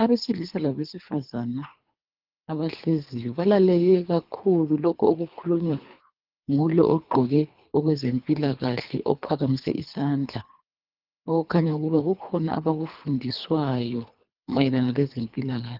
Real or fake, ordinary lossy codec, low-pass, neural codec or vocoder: fake; Opus, 32 kbps; 5.4 kHz; codec, 24 kHz, 3 kbps, HILCodec